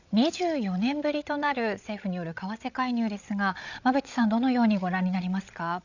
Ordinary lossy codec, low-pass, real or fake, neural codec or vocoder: none; 7.2 kHz; fake; codec, 16 kHz, 8 kbps, FreqCodec, larger model